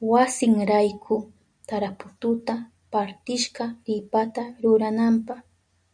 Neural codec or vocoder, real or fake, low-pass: none; real; 9.9 kHz